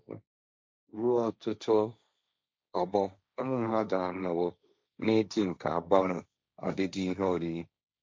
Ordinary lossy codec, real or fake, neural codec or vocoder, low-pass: none; fake; codec, 16 kHz, 1.1 kbps, Voila-Tokenizer; none